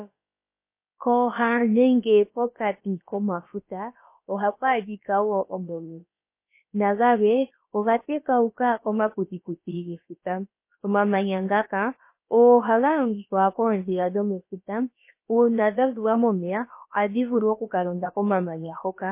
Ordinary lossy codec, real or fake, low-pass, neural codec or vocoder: MP3, 24 kbps; fake; 3.6 kHz; codec, 16 kHz, about 1 kbps, DyCAST, with the encoder's durations